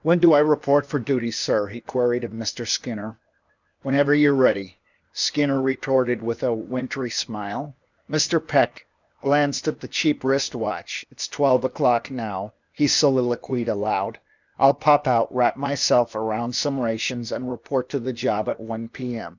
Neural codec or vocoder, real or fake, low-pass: codec, 16 kHz, 0.8 kbps, ZipCodec; fake; 7.2 kHz